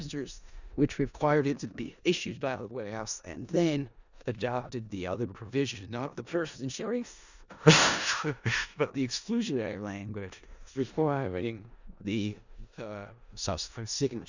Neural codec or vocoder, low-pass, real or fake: codec, 16 kHz in and 24 kHz out, 0.4 kbps, LongCat-Audio-Codec, four codebook decoder; 7.2 kHz; fake